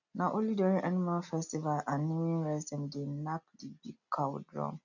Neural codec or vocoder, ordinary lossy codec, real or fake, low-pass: none; none; real; 7.2 kHz